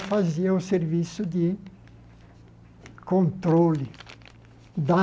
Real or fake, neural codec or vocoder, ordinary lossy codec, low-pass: real; none; none; none